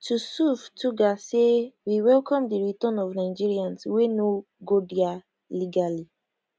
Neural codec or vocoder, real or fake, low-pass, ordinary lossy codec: none; real; none; none